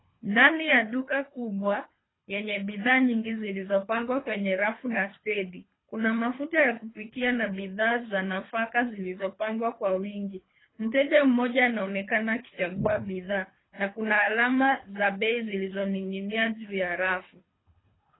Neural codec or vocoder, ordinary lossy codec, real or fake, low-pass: codec, 24 kHz, 3 kbps, HILCodec; AAC, 16 kbps; fake; 7.2 kHz